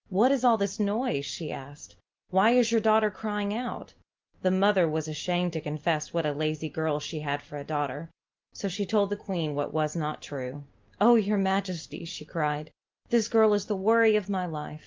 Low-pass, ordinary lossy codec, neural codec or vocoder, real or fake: 7.2 kHz; Opus, 32 kbps; none; real